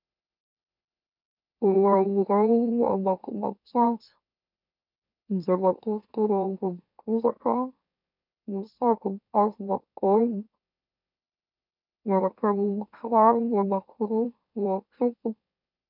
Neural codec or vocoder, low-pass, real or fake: autoencoder, 44.1 kHz, a latent of 192 numbers a frame, MeloTTS; 5.4 kHz; fake